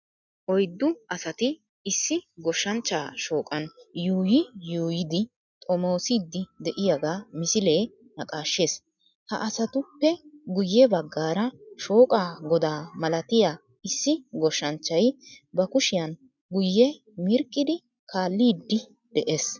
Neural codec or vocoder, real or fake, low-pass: none; real; 7.2 kHz